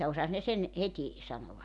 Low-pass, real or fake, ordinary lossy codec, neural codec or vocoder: 10.8 kHz; real; none; none